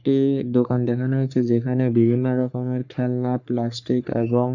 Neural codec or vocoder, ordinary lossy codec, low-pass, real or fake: codec, 44.1 kHz, 3.4 kbps, Pupu-Codec; none; 7.2 kHz; fake